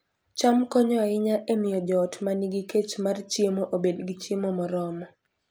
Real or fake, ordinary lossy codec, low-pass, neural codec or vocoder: real; none; none; none